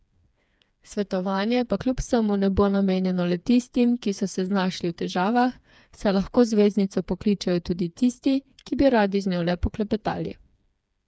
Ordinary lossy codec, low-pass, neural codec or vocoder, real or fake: none; none; codec, 16 kHz, 4 kbps, FreqCodec, smaller model; fake